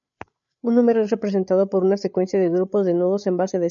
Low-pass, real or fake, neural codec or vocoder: 7.2 kHz; fake; codec, 16 kHz, 8 kbps, FreqCodec, larger model